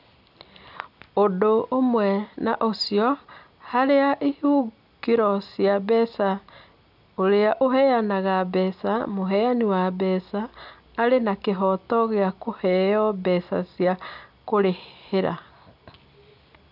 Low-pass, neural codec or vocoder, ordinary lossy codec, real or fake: 5.4 kHz; none; none; real